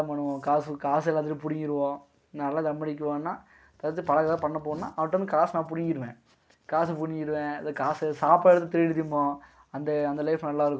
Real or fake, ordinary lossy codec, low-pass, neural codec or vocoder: real; none; none; none